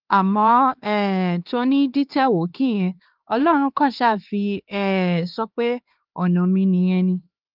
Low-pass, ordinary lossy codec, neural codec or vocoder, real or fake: 5.4 kHz; Opus, 24 kbps; codec, 16 kHz, 2 kbps, X-Codec, HuBERT features, trained on LibriSpeech; fake